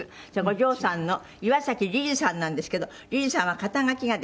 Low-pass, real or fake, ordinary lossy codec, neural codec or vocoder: none; real; none; none